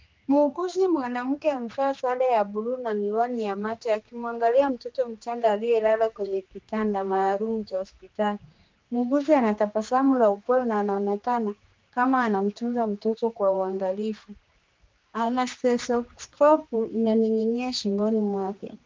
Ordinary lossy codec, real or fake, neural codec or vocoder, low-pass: Opus, 24 kbps; fake; codec, 16 kHz, 2 kbps, X-Codec, HuBERT features, trained on general audio; 7.2 kHz